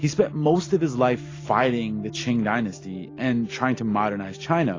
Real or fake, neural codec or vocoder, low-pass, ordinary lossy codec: real; none; 7.2 kHz; AAC, 32 kbps